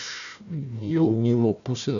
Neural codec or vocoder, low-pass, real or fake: codec, 16 kHz, 1 kbps, FunCodec, trained on Chinese and English, 50 frames a second; 7.2 kHz; fake